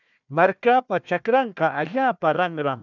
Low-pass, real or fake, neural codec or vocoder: 7.2 kHz; fake; codec, 16 kHz, 1 kbps, FunCodec, trained on Chinese and English, 50 frames a second